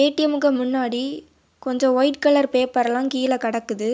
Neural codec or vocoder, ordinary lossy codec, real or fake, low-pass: none; none; real; none